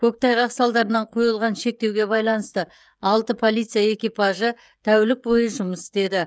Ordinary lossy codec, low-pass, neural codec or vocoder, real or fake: none; none; codec, 16 kHz, 16 kbps, FreqCodec, smaller model; fake